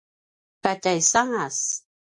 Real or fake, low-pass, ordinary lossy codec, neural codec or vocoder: real; 10.8 kHz; MP3, 48 kbps; none